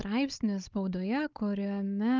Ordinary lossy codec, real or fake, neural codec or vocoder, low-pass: Opus, 32 kbps; fake; codec, 16 kHz, 16 kbps, FunCodec, trained on Chinese and English, 50 frames a second; 7.2 kHz